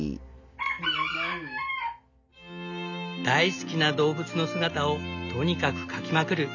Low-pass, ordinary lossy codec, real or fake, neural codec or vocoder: 7.2 kHz; none; real; none